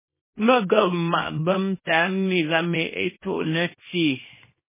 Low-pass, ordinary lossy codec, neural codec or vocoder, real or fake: 3.6 kHz; MP3, 16 kbps; codec, 24 kHz, 0.9 kbps, WavTokenizer, small release; fake